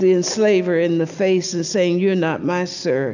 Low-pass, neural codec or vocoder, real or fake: 7.2 kHz; none; real